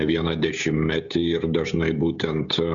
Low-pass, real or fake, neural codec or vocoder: 7.2 kHz; fake; codec, 16 kHz, 16 kbps, FreqCodec, smaller model